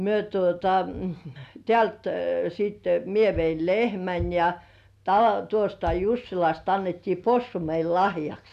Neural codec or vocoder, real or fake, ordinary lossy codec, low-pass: none; real; none; 14.4 kHz